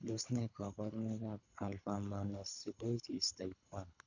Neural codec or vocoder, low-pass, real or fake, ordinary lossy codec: codec, 24 kHz, 3 kbps, HILCodec; 7.2 kHz; fake; none